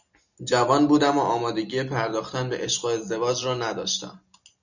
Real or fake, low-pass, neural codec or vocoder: real; 7.2 kHz; none